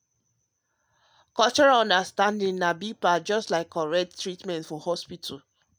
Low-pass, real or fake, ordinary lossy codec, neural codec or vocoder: none; real; none; none